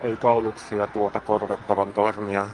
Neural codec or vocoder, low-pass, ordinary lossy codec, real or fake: codec, 44.1 kHz, 2.6 kbps, SNAC; 10.8 kHz; Opus, 24 kbps; fake